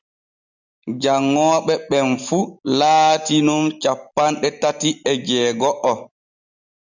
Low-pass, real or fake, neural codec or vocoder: 7.2 kHz; real; none